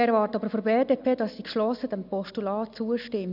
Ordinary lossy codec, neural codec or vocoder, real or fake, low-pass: none; none; real; 5.4 kHz